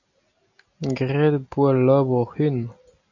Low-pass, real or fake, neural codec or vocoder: 7.2 kHz; real; none